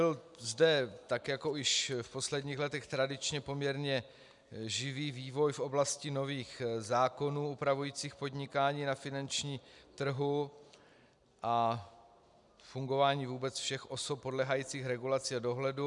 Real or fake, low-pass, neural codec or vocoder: real; 10.8 kHz; none